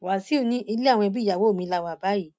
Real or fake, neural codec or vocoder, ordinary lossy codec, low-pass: real; none; none; none